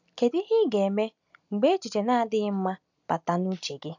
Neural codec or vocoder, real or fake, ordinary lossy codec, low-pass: none; real; none; 7.2 kHz